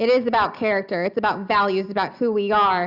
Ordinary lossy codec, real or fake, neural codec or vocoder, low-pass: Opus, 64 kbps; real; none; 5.4 kHz